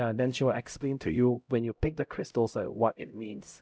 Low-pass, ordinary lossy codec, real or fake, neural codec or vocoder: none; none; fake; codec, 16 kHz, 0.5 kbps, X-Codec, HuBERT features, trained on LibriSpeech